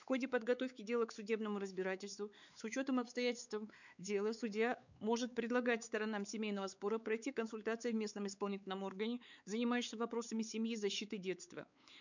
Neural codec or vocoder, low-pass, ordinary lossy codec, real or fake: codec, 16 kHz, 4 kbps, X-Codec, WavLM features, trained on Multilingual LibriSpeech; 7.2 kHz; none; fake